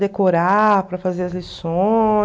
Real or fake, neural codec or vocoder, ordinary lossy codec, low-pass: real; none; none; none